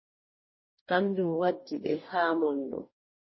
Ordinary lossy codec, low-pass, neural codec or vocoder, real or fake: MP3, 24 kbps; 7.2 kHz; codec, 44.1 kHz, 2.6 kbps, DAC; fake